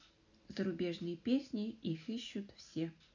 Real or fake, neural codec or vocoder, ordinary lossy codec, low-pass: real; none; none; 7.2 kHz